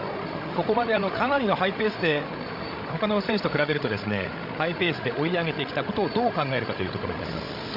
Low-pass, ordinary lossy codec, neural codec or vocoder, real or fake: 5.4 kHz; Opus, 64 kbps; codec, 16 kHz, 16 kbps, FreqCodec, larger model; fake